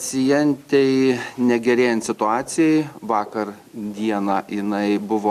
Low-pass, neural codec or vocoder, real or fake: 14.4 kHz; none; real